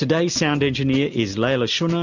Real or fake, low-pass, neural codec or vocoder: real; 7.2 kHz; none